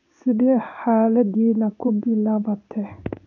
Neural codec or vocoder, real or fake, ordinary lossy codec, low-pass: codec, 16 kHz in and 24 kHz out, 1 kbps, XY-Tokenizer; fake; none; 7.2 kHz